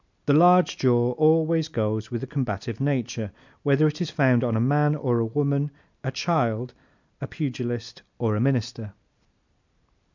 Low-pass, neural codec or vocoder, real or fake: 7.2 kHz; none; real